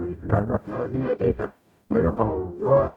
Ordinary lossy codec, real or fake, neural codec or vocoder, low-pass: none; fake; codec, 44.1 kHz, 0.9 kbps, DAC; 19.8 kHz